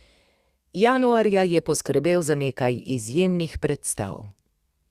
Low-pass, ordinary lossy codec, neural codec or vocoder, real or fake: 14.4 kHz; Opus, 64 kbps; codec, 32 kHz, 1.9 kbps, SNAC; fake